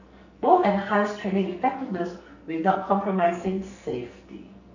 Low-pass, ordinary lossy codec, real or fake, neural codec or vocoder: 7.2 kHz; none; fake; codec, 44.1 kHz, 2.6 kbps, SNAC